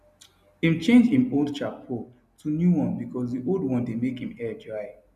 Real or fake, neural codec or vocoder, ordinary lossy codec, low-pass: real; none; none; 14.4 kHz